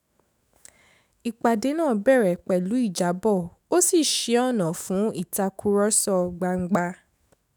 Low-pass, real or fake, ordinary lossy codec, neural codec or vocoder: none; fake; none; autoencoder, 48 kHz, 128 numbers a frame, DAC-VAE, trained on Japanese speech